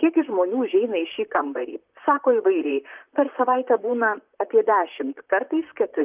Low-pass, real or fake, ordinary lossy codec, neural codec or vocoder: 3.6 kHz; fake; Opus, 24 kbps; vocoder, 44.1 kHz, 128 mel bands, Pupu-Vocoder